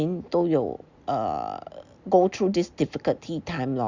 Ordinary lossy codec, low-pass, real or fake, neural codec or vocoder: Opus, 64 kbps; 7.2 kHz; real; none